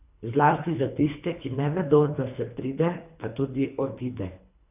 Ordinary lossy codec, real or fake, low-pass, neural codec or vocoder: none; fake; 3.6 kHz; codec, 24 kHz, 3 kbps, HILCodec